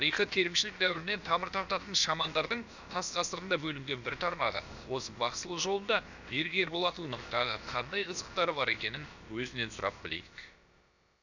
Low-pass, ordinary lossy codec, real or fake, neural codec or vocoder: 7.2 kHz; none; fake; codec, 16 kHz, about 1 kbps, DyCAST, with the encoder's durations